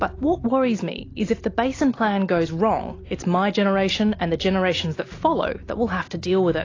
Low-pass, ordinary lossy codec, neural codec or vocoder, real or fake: 7.2 kHz; AAC, 32 kbps; none; real